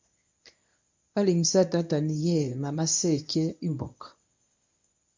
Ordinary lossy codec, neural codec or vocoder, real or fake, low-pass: MP3, 64 kbps; codec, 24 kHz, 0.9 kbps, WavTokenizer, medium speech release version 1; fake; 7.2 kHz